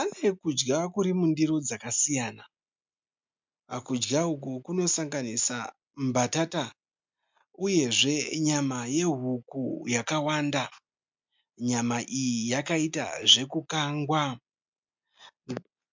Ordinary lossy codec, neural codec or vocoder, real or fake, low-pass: MP3, 64 kbps; none; real; 7.2 kHz